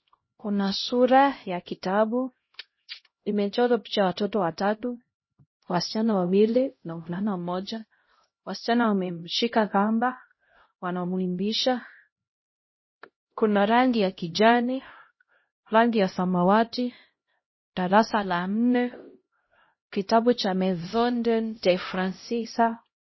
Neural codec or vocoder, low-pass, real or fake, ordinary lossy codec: codec, 16 kHz, 0.5 kbps, X-Codec, HuBERT features, trained on LibriSpeech; 7.2 kHz; fake; MP3, 24 kbps